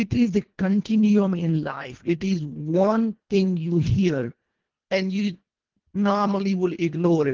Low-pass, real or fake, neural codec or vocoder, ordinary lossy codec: 7.2 kHz; fake; codec, 24 kHz, 1.5 kbps, HILCodec; Opus, 16 kbps